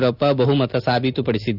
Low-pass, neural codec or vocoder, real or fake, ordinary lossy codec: 5.4 kHz; none; real; none